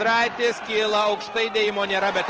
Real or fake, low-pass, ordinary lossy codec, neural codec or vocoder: real; 7.2 kHz; Opus, 16 kbps; none